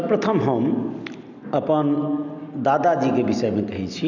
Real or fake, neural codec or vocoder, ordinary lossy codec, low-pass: real; none; none; 7.2 kHz